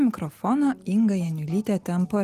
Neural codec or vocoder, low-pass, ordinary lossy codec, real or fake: none; 14.4 kHz; Opus, 24 kbps; real